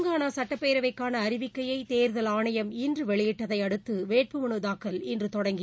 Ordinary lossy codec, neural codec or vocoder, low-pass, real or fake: none; none; none; real